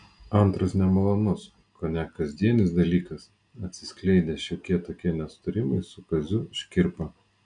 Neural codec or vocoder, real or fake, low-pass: none; real; 9.9 kHz